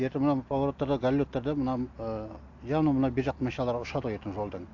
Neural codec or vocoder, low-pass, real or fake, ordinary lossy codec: none; 7.2 kHz; real; AAC, 48 kbps